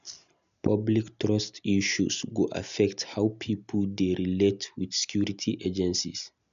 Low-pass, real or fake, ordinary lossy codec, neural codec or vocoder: 7.2 kHz; real; MP3, 96 kbps; none